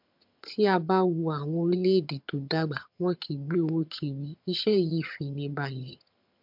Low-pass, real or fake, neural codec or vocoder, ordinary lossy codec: 5.4 kHz; fake; vocoder, 22.05 kHz, 80 mel bands, HiFi-GAN; MP3, 48 kbps